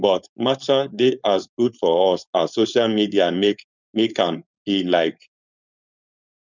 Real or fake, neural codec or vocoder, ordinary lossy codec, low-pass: fake; codec, 16 kHz, 4.8 kbps, FACodec; none; 7.2 kHz